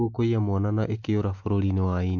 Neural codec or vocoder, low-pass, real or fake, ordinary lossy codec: none; 7.2 kHz; real; MP3, 48 kbps